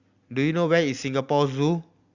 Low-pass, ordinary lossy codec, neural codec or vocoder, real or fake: 7.2 kHz; Opus, 64 kbps; none; real